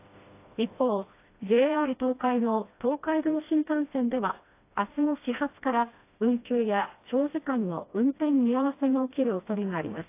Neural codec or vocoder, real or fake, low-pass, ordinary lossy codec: codec, 16 kHz, 1 kbps, FreqCodec, smaller model; fake; 3.6 kHz; AAC, 24 kbps